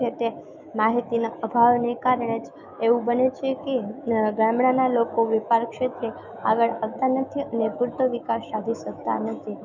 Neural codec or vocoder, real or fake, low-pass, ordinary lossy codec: autoencoder, 48 kHz, 128 numbers a frame, DAC-VAE, trained on Japanese speech; fake; 7.2 kHz; none